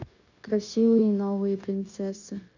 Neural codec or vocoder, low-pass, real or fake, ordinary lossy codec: codec, 16 kHz, 0.9 kbps, LongCat-Audio-Codec; 7.2 kHz; fake; none